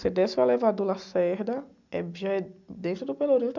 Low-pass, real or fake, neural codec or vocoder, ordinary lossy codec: 7.2 kHz; real; none; MP3, 64 kbps